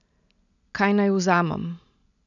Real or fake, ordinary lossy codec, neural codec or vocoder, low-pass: real; none; none; 7.2 kHz